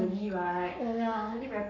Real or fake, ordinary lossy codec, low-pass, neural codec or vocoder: fake; none; 7.2 kHz; codec, 44.1 kHz, 7.8 kbps, Pupu-Codec